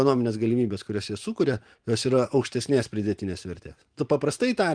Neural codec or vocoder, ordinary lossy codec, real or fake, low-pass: vocoder, 44.1 kHz, 128 mel bands every 512 samples, BigVGAN v2; Opus, 24 kbps; fake; 9.9 kHz